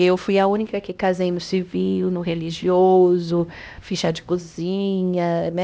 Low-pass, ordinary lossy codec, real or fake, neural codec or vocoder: none; none; fake; codec, 16 kHz, 1 kbps, X-Codec, HuBERT features, trained on LibriSpeech